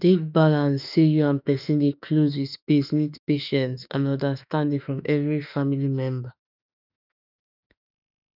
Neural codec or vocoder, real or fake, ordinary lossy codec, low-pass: autoencoder, 48 kHz, 32 numbers a frame, DAC-VAE, trained on Japanese speech; fake; none; 5.4 kHz